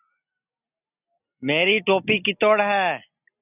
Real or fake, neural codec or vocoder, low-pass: real; none; 3.6 kHz